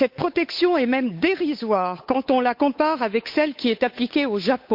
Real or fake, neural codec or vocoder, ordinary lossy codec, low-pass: fake; codec, 16 kHz, 8 kbps, FunCodec, trained on Chinese and English, 25 frames a second; MP3, 48 kbps; 5.4 kHz